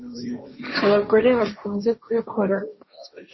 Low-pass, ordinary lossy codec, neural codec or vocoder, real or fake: 7.2 kHz; MP3, 24 kbps; codec, 16 kHz, 1.1 kbps, Voila-Tokenizer; fake